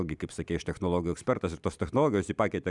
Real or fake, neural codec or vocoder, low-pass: real; none; 10.8 kHz